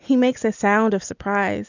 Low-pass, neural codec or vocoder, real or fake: 7.2 kHz; none; real